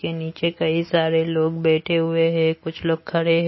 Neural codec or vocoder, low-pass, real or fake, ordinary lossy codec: none; 7.2 kHz; real; MP3, 24 kbps